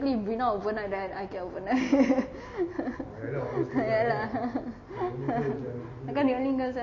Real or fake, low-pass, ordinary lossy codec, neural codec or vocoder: real; 7.2 kHz; MP3, 32 kbps; none